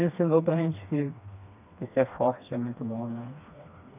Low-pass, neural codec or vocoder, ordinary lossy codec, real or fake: 3.6 kHz; codec, 16 kHz, 2 kbps, FreqCodec, smaller model; none; fake